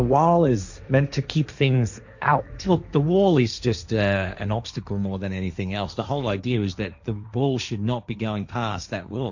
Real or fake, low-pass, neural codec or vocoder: fake; 7.2 kHz; codec, 16 kHz, 1.1 kbps, Voila-Tokenizer